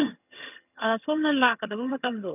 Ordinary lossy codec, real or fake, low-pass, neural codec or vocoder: none; fake; 3.6 kHz; vocoder, 22.05 kHz, 80 mel bands, HiFi-GAN